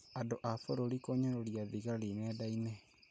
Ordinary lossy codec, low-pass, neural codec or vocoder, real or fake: none; none; none; real